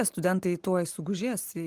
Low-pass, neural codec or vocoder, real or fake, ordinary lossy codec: 14.4 kHz; none; real; Opus, 24 kbps